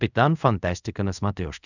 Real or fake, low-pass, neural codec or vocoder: fake; 7.2 kHz; codec, 24 kHz, 0.5 kbps, DualCodec